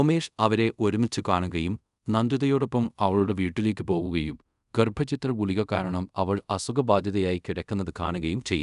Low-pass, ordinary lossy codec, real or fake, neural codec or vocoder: 10.8 kHz; none; fake; codec, 24 kHz, 0.5 kbps, DualCodec